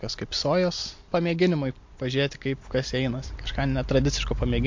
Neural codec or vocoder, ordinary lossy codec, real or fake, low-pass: none; MP3, 48 kbps; real; 7.2 kHz